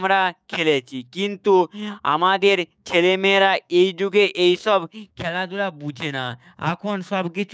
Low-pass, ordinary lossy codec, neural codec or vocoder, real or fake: none; none; codec, 16 kHz, 6 kbps, DAC; fake